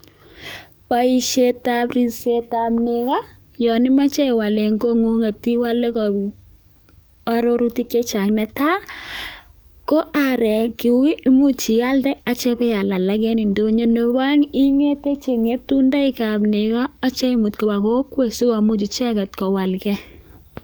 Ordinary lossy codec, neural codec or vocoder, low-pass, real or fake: none; codec, 44.1 kHz, 7.8 kbps, DAC; none; fake